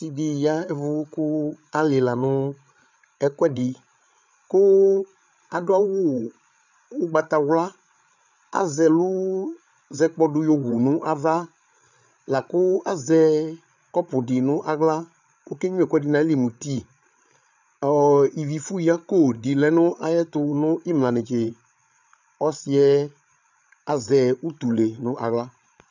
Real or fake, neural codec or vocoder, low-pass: fake; codec, 16 kHz, 8 kbps, FreqCodec, larger model; 7.2 kHz